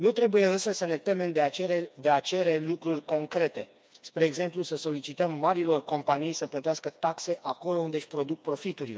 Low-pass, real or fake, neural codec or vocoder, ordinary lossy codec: none; fake; codec, 16 kHz, 2 kbps, FreqCodec, smaller model; none